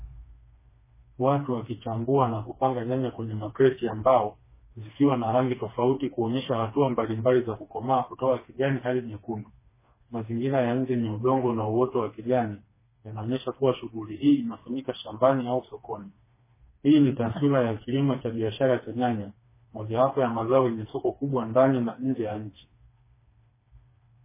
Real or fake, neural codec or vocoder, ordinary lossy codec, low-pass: fake; codec, 16 kHz, 2 kbps, FreqCodec, smaller model; MP3, 16 kbps; 3.6 kHz